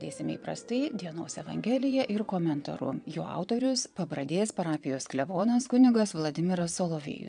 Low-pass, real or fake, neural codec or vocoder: 9.9 kHz; fake; vocoder, 22.05 kHz, 80 mel bands, Vocos